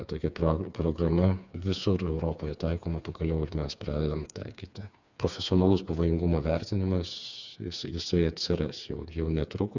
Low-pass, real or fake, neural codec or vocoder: 7.2 kHz; fake; codec, 16 kHz, 4 kbps, FreqCodec, smaller model